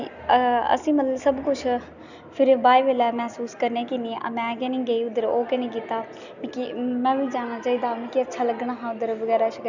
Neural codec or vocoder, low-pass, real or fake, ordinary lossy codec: none; 7.2 kHz; real; none